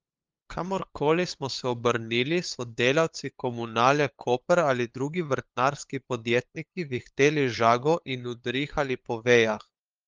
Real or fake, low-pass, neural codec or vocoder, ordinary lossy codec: fake; 7.2 kHz; codec, 16 kHz, 8 kbps, FunCodec, trained on LibriTTS, 25 frames a second; Opus, 32 kbps